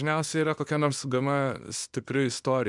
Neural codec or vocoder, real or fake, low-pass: codec, 24 kHz, 0.9 kbps, WavTokenizer, small release; fake; 10.8 kHz